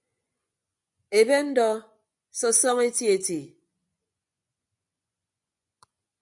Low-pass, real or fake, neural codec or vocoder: 10.8 kHz; fake; vocoder, 24 kHz, 100 mel bands, Vocos